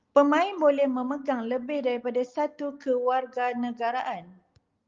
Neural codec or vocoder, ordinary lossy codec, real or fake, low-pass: none; Opus, 32 kbps; real; 7.2 kHz